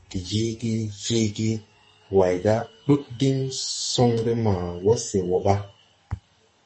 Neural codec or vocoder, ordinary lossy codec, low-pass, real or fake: codec, 44.1 kHz, 2.6 kbps, SNAC; MP3, 32 kbps; 10.8 kHz; fake